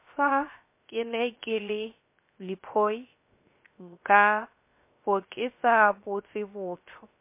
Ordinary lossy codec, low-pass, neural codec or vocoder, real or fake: MP3, 24 kbps; 3.6 kHz; codec, 16 kHz, 0.3 kbps, FocalCodec; fake